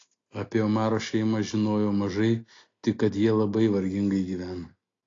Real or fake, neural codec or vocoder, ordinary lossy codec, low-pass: real; none; AAC, 32 kbps; 7.2 kHz